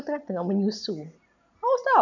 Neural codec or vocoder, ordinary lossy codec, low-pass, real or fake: none; none; 7.2 kHz; real